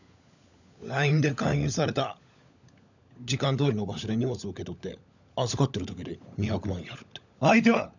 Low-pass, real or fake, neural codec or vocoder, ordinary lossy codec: 7.2 kHz; fake; codec, 16 kHz, 16 kbps, FunCodec, trained on LibriTTS, 50 frames a second; none